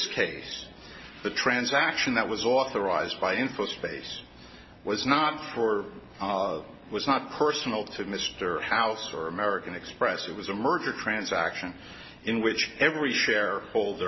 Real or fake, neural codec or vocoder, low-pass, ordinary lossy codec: real; none; 7.2 kHz; MP3, 24 kbps